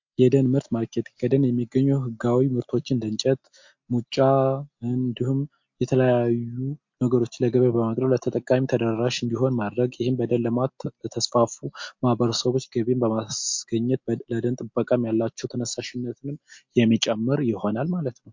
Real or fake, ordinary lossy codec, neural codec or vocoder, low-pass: real; MP3, 48 kbps; none; 7.2 kHz